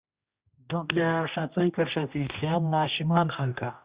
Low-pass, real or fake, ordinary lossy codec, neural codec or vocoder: 3.6 kHz; fake; Opus, 64 kbps; codec, 16 kHz, 1 kbps, X-Codec, HuBERT features, trained on general audio